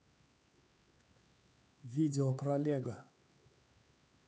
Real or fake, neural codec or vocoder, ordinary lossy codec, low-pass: fake; codec, 16 kHz, 4 kbps, X-Codec, HuBERT features, trained on LibriSpeech; none; none